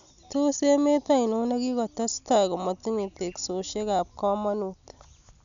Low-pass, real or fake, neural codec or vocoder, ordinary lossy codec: 7.2 kHz; real; none; none